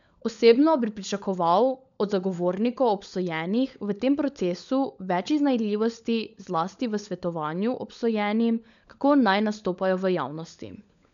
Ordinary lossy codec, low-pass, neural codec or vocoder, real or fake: none; 7.2 kHz; codec, 16 kHz, 16 kbps, FunCodec, trained on LibriTTS, 50 frames a second; fake